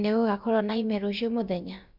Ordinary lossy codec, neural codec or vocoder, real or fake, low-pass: MP3, 48 kbps; codec, 16 kHz, about 1 kbps, DyCAST, with the encoder's durations; fake; 5.4 kHz